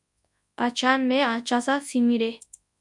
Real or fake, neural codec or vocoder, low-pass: fake; codec, 24 kHz, 0.9 kbps, WavTokenizer, large speech release; 10.8 kHz